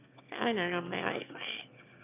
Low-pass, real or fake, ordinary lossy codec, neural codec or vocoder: 3.6 kHz; fake; none; autoencoder, 22.05 kHz, a latent of 192 numbers a frame, VITS, trained on one speaker